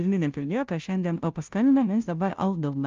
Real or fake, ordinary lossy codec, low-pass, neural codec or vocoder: fake; Opus, 32 kbps; 7.2 kHz; codec, 16 kHz, 0.5 kbps, FunCodec, trained on Chinese and English, 25 frames a second